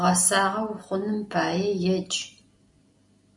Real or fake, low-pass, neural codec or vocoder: real; 10.8 kHz; none